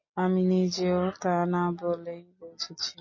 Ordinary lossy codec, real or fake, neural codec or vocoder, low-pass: MP3, 32 kbps; real; none; 7.2 kHz